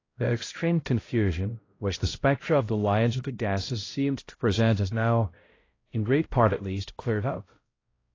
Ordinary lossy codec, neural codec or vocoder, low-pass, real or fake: AAC, 32 kbps; codec, 16 kHz, 0.5 kbps, X-Codec, HuBERT features, trained on balanced general audio; 7.2 kHz; fake